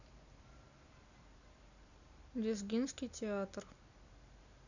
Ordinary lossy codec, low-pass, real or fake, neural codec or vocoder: none; 7.2 kHz; real; none